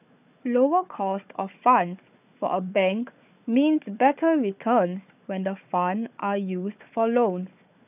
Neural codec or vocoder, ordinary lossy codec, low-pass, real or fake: codec, 16 kHz, 4 kbps, FunCodec, trained on Chinese and English, 50 frames a second; none; 3.6 kHz; fake